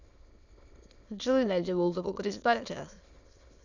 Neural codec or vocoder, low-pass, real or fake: autoencoder, 22.05 kHz, a latent of 192 numbers a frame, VITS, trained on many speakers; 7.2 kHz; fake